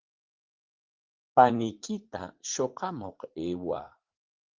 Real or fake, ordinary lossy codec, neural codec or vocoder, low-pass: fake; Opus, 16 kbps; vocoder, 44.1 kHz, 80 mel bands, Vocos; 7.2 kHz